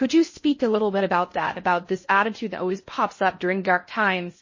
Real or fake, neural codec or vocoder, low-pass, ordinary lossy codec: fake; codec, 16 kHz in and 24 kHz out, 0.6 kbps, FocalCodec, streaming, 2048 codes; 7.2 kHz; MP3, 32 kbps